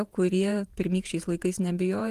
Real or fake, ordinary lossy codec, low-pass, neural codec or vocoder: fake; Opus, 24 kbps; 14.4 kHz; vocoder, 48 kHz, 128 mel bands, Vocos